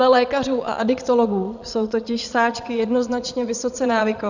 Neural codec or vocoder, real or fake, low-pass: vocoder, 22.05 kHz, 80 mel bands, Vocos; fake; 7.2 kHz